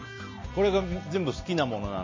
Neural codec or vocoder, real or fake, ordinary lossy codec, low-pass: none; real; MP3, 32 kbps; 7.2 kHz